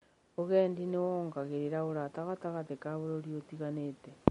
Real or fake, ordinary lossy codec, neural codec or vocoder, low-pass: real; MP3, 48 kbps; none; 10.8 kHz